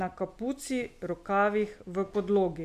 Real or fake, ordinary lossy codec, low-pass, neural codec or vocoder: real; MP3, 96 kbps; 14.4 kHz; none